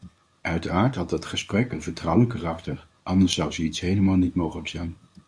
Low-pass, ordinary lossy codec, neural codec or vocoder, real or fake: 9.9 kHz; Opus, 64 kbps; codec, 24 kHz, 0.9 kbps, WavTokenizer, medium speech release version 1; fake